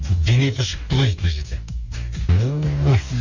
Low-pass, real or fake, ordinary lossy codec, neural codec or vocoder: 7.2 kHz; fake; none; codec, 44.1 kHz, 2.6 kbps, DAC